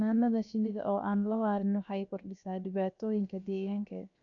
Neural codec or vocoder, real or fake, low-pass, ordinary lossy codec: codec, 16 kHz, 0.7 kbps, FocalCodec; fake; 7.2 kHz; none